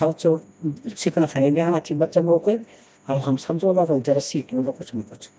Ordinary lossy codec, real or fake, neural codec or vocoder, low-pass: none; fake; codec, 16 kHz, 1 kbps, FreqCodec, smaller model; none